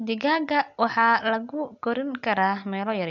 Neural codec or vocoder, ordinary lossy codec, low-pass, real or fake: none; none; 7.2 kHz; real